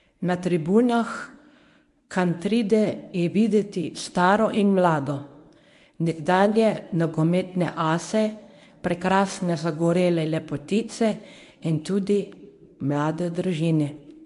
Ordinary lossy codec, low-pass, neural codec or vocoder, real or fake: MP3, 64 kbps; 10.8 kHz; codec, 24 kHz, 0.9 kbps, WavTokenizer, medium speech release version 1; fake